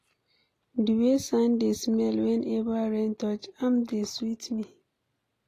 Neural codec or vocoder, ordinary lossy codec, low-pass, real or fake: none; AAC, 48 kbps; 14.4 kHz; real